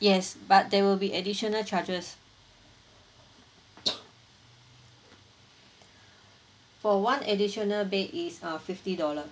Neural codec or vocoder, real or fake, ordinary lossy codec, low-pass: none; real; none; none